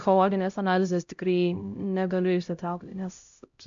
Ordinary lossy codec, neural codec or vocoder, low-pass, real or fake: MP3, 64 kbps; codec, 16 kHz, 0.5 kbps, X-Codec, HuBERT features, trained on LibriSpeech; 7.2 kHz; fake